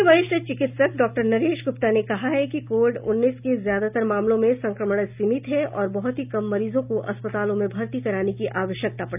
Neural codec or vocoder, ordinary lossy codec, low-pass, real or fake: none; none; 3.6 kHz; real